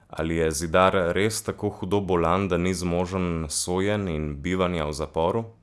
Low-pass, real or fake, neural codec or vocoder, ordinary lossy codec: none; real; none; none